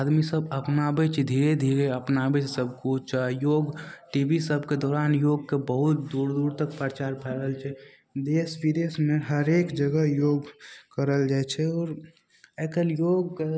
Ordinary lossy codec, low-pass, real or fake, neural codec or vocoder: none; none; real; none